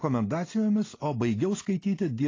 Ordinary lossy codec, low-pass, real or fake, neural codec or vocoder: AAC, 32 kbps; 7.2 kHz; real; none